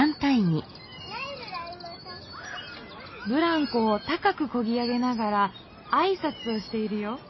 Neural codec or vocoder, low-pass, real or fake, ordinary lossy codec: none; 7.2 kHz; real; MP3, 24 kbps